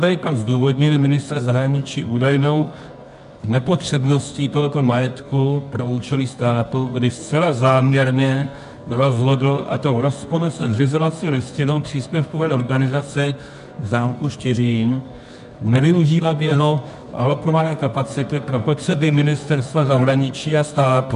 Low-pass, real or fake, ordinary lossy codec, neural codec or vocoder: 10.8 kHz; fake; AAC, 96 kbps; codec, 24 kHz, 0.9 kbps, WavTokenizer, medium music audio release